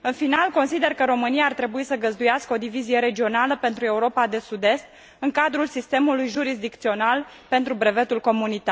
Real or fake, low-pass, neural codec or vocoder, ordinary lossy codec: real; none; none; none